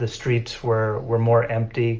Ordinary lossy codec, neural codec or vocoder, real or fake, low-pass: Opus, 24 kbps; none; real; 7.2 kHz